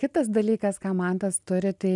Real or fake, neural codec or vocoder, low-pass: real; none; 10.8 kHz